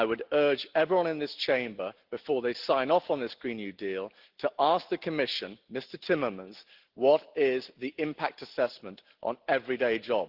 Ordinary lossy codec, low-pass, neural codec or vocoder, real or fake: Opus, 16 kbps; 5.4 kHz; none; real